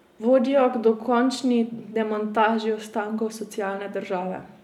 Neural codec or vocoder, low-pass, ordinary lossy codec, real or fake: none; 19.8 kHz; none; real